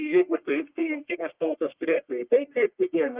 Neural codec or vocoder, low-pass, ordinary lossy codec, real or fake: codec, 44.1 kHz, 1.7 kbps, Pupu-Codec; 3.6 kHz; Opus, 32 kbps; fake